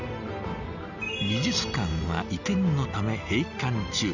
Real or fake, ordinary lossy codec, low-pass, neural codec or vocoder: real; none; 7.2 kHz; none